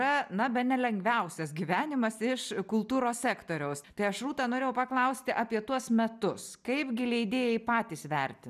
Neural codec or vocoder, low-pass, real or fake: none; 14.4 kHz; real